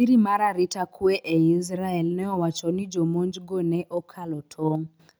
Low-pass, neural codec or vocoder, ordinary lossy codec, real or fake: none; none; none; real